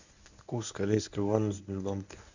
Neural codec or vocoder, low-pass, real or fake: codec, 24 kHz, 0.9 kbps, WavTokenizer, medium speech release version 1; 7.2 kHz; fake